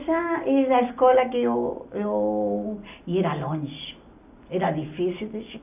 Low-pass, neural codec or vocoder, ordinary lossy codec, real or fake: 3.6 kHz; none; none; real